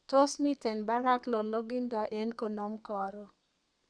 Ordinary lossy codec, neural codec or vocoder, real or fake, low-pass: Opus, 64 kbps; codec, 32 kHz, 1.9 kbps, SNAC; fake; 9.9 kHz